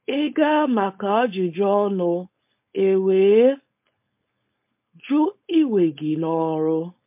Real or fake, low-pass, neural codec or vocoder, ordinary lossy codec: fake; 3.6 kHz; codec, 16 kHz, 4.8 kbps, FACodec; MP3, 32 kbps